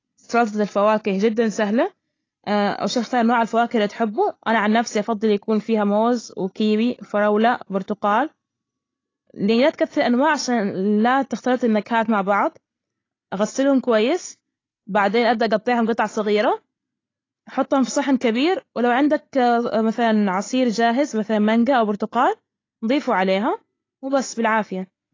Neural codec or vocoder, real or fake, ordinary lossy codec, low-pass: none; real; AAC, 32 kbps; 7.2 kHz